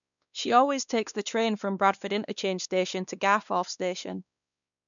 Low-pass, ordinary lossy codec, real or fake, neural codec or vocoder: 7.2 kHz; none; fake; codec, 16 kHz, 2 kbps, X-Codec, WavLM features, trained on Multilingual LibriSpeech